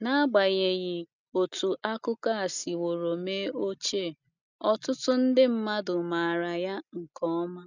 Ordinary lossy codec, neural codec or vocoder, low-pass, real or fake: none; none; 7.2 kHz; real